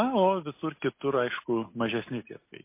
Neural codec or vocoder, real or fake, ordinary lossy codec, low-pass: none; real; MP3, 24 kbps; 3.6 kHz